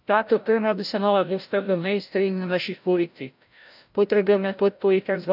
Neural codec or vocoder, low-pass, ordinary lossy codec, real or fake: codec, 16 kHz, 0.5 kbps, FreqCodec, larger model; 5.4 kHz; none; fake